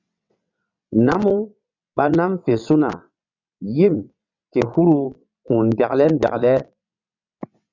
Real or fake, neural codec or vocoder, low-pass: fake; vocoder, 22.05 kHz, 80 mel bands, WaveNeXt; 7.2 kHz